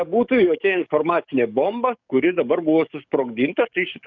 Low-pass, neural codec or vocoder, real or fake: 7.2 kHz; codec, 44.1 kHz, 7.8 kbps, DAC; fake